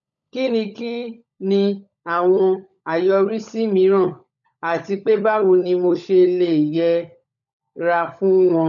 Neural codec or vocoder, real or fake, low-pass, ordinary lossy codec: codec, 16 kHz, 16 kbps, FunCodec, trained on LibriTTS, 50 frames a second; fake; 7.2 kHz; none